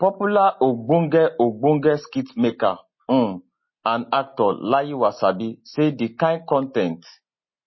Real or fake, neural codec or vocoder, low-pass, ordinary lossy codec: real; none; 7.2 kHz; MP3, 24 kbps